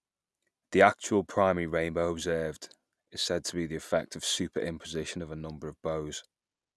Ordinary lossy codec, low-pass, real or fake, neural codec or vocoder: none; none; real; none